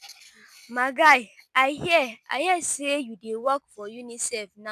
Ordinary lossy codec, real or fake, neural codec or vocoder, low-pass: none; real; none; 14.4 kHz